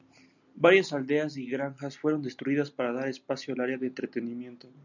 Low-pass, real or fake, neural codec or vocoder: 7.2 kHz; real; none